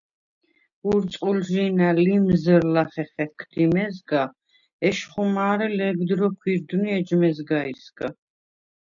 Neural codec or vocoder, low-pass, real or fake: none; 7.2 kHz; real